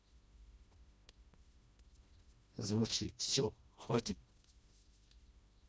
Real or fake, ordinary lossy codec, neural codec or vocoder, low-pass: fake; none; codec, 16 kHz, 1 kbps, FreqCodec, smaller model; none